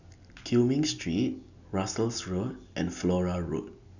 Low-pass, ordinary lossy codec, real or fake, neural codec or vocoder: 7.2 kHz; none; real; none